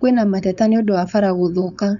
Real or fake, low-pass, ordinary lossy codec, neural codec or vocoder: fake; 7.2 kHz; none; codec, 16 kHz, 4.8 kbps, FACodec